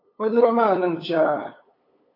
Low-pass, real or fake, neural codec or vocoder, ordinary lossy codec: 5.4 kHz; fake; codec, 16 kHz, 8 kbps, FunCodec, trained on LibriTTS, 25 frames a second; AAC, 24 kbps